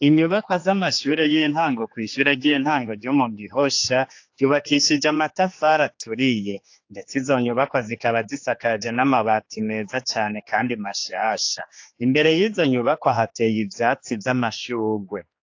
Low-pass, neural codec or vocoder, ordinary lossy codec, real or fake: 7.2 kHz; codec, 16 kHz, 2 kbps, X-Codec, HuBERT features, trained on general audio; AAC, 48 kbps; fake